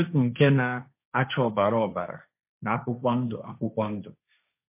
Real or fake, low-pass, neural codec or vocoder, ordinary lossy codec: fake; 3.6 kHz; codec, 16 kHz, 1.1 kbps, Voila-Tokenizer; MP3, 24 kbps